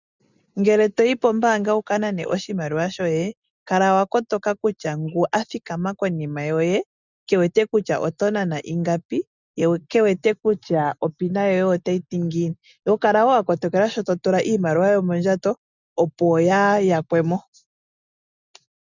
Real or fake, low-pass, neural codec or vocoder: real; 7.2 kHz; none